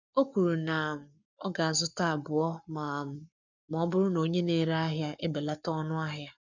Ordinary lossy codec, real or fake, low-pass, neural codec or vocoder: none; fake; 7.2 kHz; codec, 44.1 kHz, 7.8 kbps, Pupu-Codec